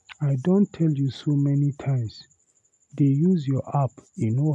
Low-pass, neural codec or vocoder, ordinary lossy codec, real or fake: none; none; none; real